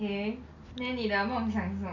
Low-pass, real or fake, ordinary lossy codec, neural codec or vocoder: 7.2 kHz; real; none; none